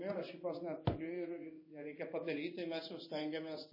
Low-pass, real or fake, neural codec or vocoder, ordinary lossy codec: 5.4 kHz; fake; codec, 16 kHz in and 24 kHz out, 1 kbps, XY-Tokenizer; MP3, 24 kbps